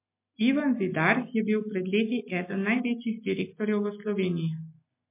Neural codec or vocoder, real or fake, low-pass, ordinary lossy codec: none; real; 3.6 kHz; MP3, 24 kbps